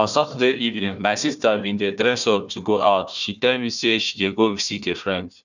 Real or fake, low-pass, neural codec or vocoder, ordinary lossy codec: fake; 7.2 kHz; codec, 16 kHz, 1 kbps, FunCodec, trained on Chinese and English, 50 frames a second; none